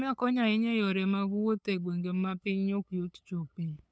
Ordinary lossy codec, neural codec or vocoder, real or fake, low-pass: none; codec, 16 kHz, 8 kbps, FunCodec, trained on LibriTTS, 25 frames a second; fake; none